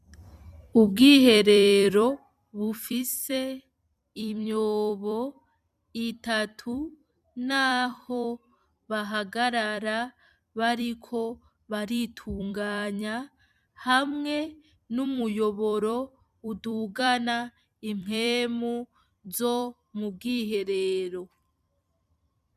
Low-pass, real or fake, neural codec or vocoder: 14.4 kHz; fake; vocoder, 44.1 kHz, 128 mel bands every 256 samples, BigVGAN v2